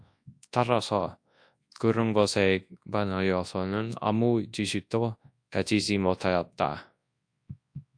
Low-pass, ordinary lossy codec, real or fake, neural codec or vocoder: 9.9 kHz; AAC, 64 kbps; fake; codec, 24 kHz, 0.9 kbps, WavTokenizer, large speech release